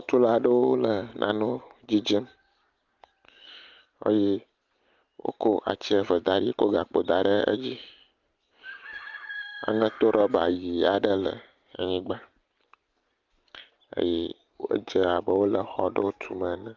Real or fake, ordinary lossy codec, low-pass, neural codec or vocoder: real; Opus, 32 kbps; 7.2 kHz; none